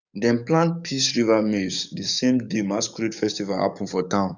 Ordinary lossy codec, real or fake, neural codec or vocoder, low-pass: none; fake; codec, 44.1 kHz, 7.8 kbps, DAC; 7.2 kHz